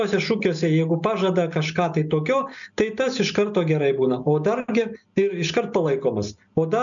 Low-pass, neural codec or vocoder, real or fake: 7.2 kHz; none; real